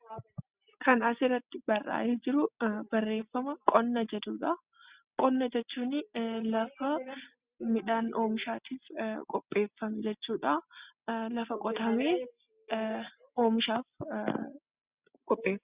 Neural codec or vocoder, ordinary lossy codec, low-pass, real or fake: none; Opus, 64 kbps; 3.6 kHz; real